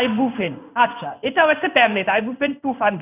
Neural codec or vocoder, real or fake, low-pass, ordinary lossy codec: codec, 16 kHz in and 24 kHz out, 1 kbps, XY-Tokenizer; fake; 3.6 kHz; none